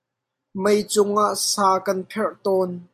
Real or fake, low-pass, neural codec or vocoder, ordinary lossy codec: real; 14.4 kHz; none; AAC, 96 kbps